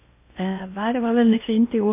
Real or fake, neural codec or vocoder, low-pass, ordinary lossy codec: fake; codec, 16 kHz in and 24 kHz out, 0.8 kbps, FocalCodec, streaming, 65536 codes; 3.6 kHz; MP3, 24 kbps